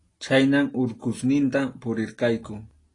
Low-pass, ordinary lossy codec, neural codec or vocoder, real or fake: 10.8 kHz; AAC, 32 kbps; none; real